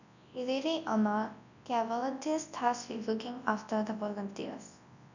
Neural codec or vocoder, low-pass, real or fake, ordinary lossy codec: codec, 24 kHz, 0.9 kbps, WavTokenizer, large speech release; 7.2 kHz; fake; none